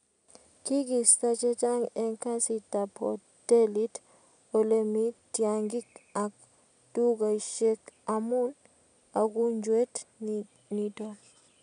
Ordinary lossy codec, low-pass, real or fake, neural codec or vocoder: none; 9.9 kHz; real; none